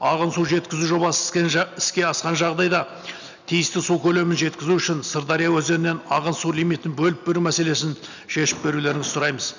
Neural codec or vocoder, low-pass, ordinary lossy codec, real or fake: none; 7.2 kHz; none; real